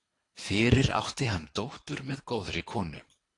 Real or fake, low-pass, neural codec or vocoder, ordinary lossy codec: fake; 10.8 kHz; codec, 24 kHz, 3 kbps, HILCodec; AAC, 48 kbps